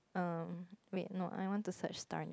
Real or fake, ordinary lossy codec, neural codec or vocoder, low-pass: real; none; none; none